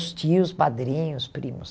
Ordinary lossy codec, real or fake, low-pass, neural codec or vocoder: none; real; none; none